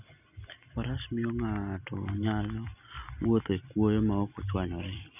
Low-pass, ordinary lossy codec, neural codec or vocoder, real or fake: 3.6 kHz; none; none; real